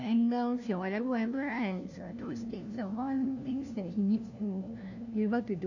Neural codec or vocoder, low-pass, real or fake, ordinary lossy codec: codec, 16 kHz, 1 kbps, FunCodec, trained on LibriTTS, 50 frames a second; 7.2 kHz; fake; none